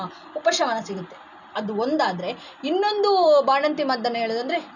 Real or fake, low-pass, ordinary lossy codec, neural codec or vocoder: real; 7.2 kHz; none; none